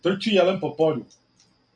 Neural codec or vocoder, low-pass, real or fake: none; 9.9 kHz; real